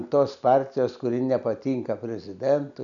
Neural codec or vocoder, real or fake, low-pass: none; real; 7.2 kHz